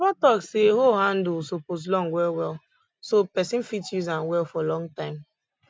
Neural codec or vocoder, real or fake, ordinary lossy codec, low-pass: none; real; none; none